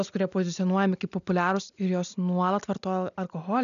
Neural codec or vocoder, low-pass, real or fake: none; 7.2 kHz; real